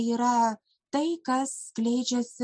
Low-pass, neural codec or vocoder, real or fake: 9.9 kHz; none; real